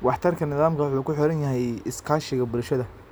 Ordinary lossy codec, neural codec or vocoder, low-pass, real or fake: none; none; none; real